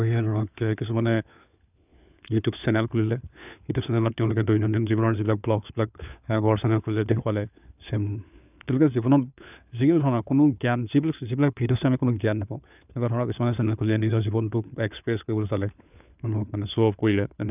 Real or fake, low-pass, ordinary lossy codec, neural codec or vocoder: fake; 3.6 kHz; none; codec, 16 kHz, 4 kbps, FunCodec, trained on LibriTTS, 50 frames a second